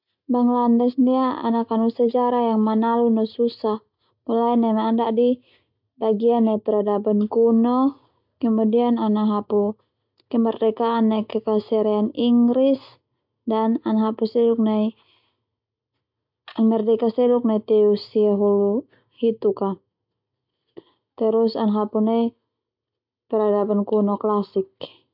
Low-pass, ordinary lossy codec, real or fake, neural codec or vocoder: 5.4 kHz; MP3, 48 kbps; real; none